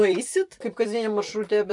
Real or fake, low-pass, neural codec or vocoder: fake; 10.8 kHz; vocoder, 44.1 kHz, 128 mel bands every 256 samples, BigVGAN v2